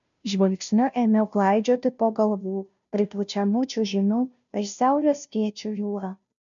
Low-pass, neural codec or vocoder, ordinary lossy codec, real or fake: 7.2 kHz; codec, 16 kHz, 0.5 kbps, FunCodec, trained on Chinese and English, 25 frames a second; MP3, 96 kbps; fake